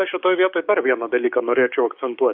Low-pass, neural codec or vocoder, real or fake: 5.4 kHz; codec, 16 kHz, 6 kbps, DAC; fake